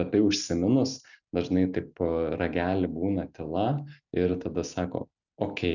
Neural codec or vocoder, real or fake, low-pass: none; real; 7.2 kHz